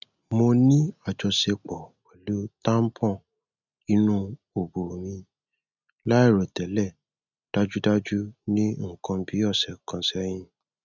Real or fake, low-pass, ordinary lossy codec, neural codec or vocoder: real; 7.2 kHz; none; none